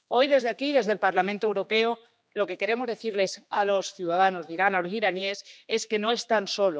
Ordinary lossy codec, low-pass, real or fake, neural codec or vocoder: none; none; fake; codec, 16 kHz, 2 kbps, X-Codec, HuBERT features, trained on general audio